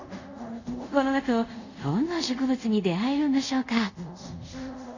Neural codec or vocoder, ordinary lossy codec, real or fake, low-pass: codec, 24 kHz, 0.5 kbps, DualCodec; none; fake; 7.2 kHz